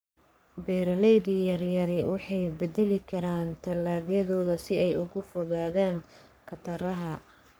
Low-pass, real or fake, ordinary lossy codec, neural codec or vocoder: none; fake; none; codec, 44.1 kHz, 3.4 kbps, Pupu-Codec